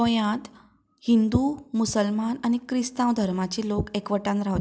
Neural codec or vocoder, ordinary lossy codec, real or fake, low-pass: none; none; real; none